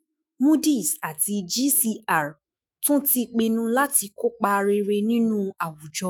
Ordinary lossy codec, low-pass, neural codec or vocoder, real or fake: none; none; autoencoder, 48 kHz, 128 numbers a frame, DAC-VAE, trained on Japanese speech; fake